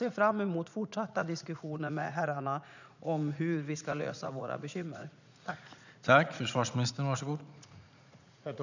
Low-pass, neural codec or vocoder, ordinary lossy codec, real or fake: 7.2 kHz; vocoder, 44.1 kHz, 80 mel bands, Vocos; none; fake